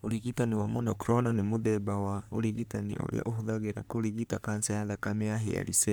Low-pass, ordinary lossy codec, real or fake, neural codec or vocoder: none; none; fake; codec, 44.1 kHz, 3.4 kbps, Pupu-Codec